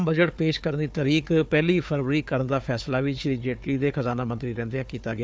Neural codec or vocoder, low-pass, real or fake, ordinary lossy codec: codec, 16 kHz, 4 kbps, FunCodec, trained on Chinese and English, 50 frames a second; none; fake; none